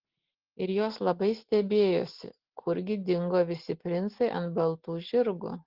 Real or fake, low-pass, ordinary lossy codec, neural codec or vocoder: real; 5.4 kHz; Opus, 16 kbps; none